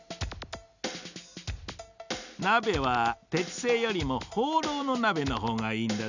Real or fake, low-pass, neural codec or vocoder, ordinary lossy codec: real; 7.2 kHz; none; none